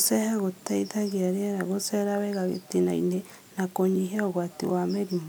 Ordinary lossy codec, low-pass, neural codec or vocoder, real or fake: none; none; none; real